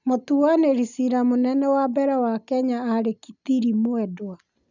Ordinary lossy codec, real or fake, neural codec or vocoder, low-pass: none; real; none; 7.2 kHz